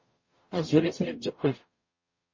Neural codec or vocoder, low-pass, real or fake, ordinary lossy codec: codec, 44.1 kHz, 0.9 kbps, DAC; 7.2 kHz; fake; MP3, 32 kbps